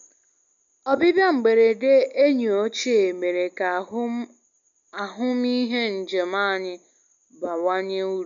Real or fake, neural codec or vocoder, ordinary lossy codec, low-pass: real; none; none; 7.2 kHz